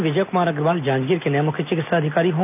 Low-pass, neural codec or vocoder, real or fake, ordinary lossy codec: 3.6 kHz; none; real; none